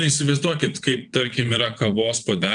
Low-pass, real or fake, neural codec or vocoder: 9.9 kHz; fake; vocoder, 22.05 kHz, 80 mel bands, WaveNeXt